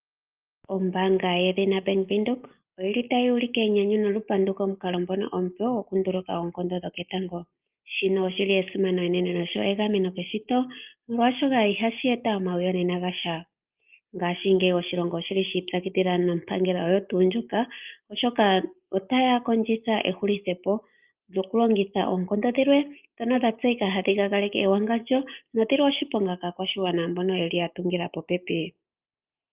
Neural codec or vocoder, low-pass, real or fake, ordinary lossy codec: none; 3.6 kHz; real; Opus, 32 kbps